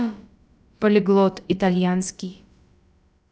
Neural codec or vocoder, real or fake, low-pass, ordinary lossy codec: codec, 16 kHz, about 1 kbps, DyCAST, with the encoder's durations; fake; none; none